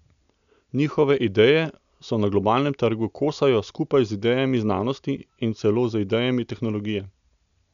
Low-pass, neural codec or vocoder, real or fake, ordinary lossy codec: 7.2 kHz; none; real; none